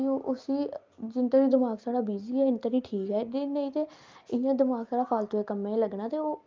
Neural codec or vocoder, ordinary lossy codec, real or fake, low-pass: none; Opus, 24 kbps; real; 7.2 kHz